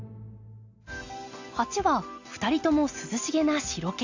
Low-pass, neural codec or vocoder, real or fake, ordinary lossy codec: 7.2 kHz; none; real; AAC, 48 kbps